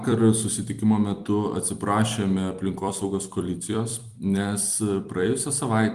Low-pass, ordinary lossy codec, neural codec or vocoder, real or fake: 14.4 kHz; Opus, 24 kbps; vocoder, 44.1 kHz, 128 mel bands every 256 samples, BigVGAN v2; fake